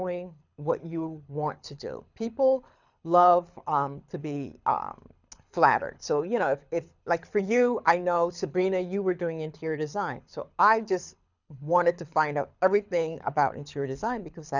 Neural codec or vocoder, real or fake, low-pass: codec, 24 kHz, 6 kbps, HILCodec; fake; 7.2 kHz